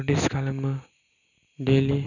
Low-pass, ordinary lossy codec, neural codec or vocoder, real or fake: 7.2 kHz; none; none; real